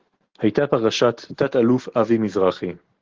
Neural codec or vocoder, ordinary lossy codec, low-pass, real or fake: none; Opus, 16 kbps; 7.2 kHz; real